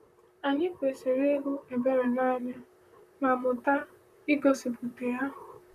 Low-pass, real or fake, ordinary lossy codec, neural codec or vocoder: 14.4 kHz; fake; none; vocoder, 44.1 kHz, 128 mel bands, Pupu-Vocoder